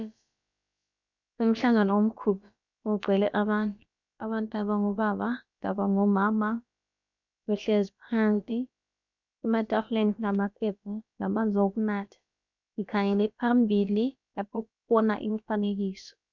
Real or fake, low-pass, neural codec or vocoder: fake; 7.2 kHz; codec, 16 kHz, about 1 kbps, DyCAST, with the encoder's durations